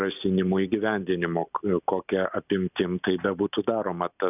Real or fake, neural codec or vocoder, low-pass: real; none; 3.6 kHz